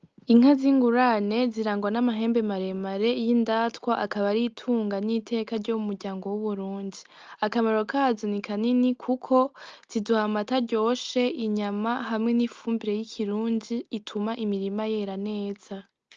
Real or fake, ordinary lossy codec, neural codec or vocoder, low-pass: real; Opus, 24 kbps; none; 7.2 kHz